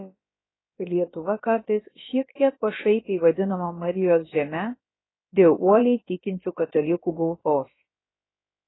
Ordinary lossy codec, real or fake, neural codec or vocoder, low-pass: AAC, 16 kbps; fake; codec, 16 kHz, about 1 kbps, DyCAST, with the encoder's durations; 7.2 kHz